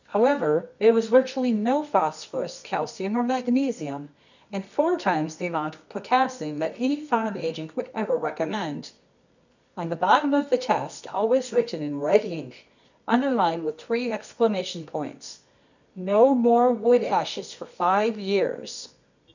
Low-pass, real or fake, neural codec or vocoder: 7.2 kHz; fake; codec, 24 kHz, 0.9 kbps, WavTokenizer, medium music audio release